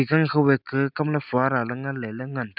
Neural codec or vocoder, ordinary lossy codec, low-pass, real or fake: none; none; 5.4 kHz; real